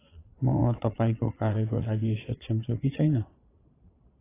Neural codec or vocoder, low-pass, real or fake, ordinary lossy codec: vocoder, 22.05 kHz, 80 mel bands, Vocos; 3.6 kHz; fake; AAC, 16 kbps